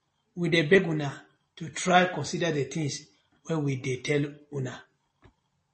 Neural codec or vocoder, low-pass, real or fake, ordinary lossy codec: none; 10.8 kHz; real; MP3, 32 kbps